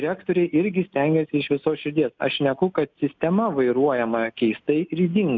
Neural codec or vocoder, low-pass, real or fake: none; 7.2 kHz; real